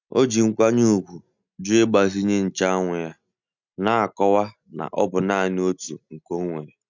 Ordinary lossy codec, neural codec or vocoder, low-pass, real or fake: none; none; 7.2 kHz; real